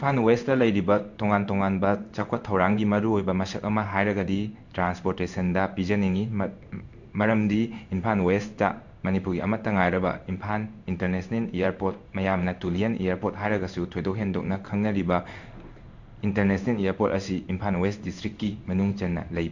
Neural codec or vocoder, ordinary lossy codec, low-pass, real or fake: codec, 16 kHz in and 24 kHz out, 1 kbps, XY-Tokenizer; none; 7.2 kHz; fake